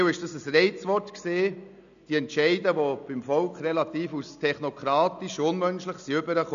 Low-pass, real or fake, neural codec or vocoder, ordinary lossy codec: 7.2 kHz; real; none; none